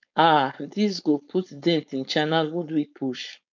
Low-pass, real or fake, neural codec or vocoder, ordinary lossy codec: 7.2 kHz; fake; codec, 16 kHz, 4.8 kbps, FACodec; MP3, 64 kbps